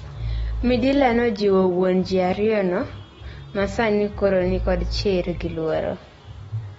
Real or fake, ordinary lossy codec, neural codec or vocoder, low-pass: real; AAC, 24 kbps; none; 19.8 kHz